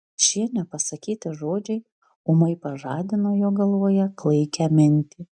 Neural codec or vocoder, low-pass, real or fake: none; 9.9 kHz; real